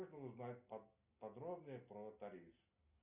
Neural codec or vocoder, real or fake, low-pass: none; real; 3.6 kHz